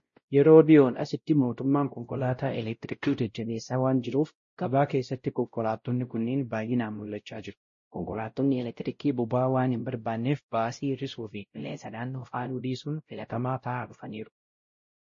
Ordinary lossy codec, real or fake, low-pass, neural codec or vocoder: MP3, 32 kbps; fake; 7.2 kHz; codec, 16 kHz, 0.5 kbps, X-Codec, WavLM features, trained on Multilingual LibriSpeech